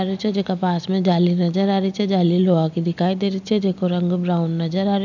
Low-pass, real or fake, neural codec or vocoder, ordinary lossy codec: 7.2 kHz; real; none; none